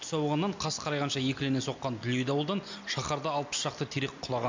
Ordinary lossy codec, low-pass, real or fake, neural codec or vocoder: MP3, 64 kbps; 7.2 kHz; real; none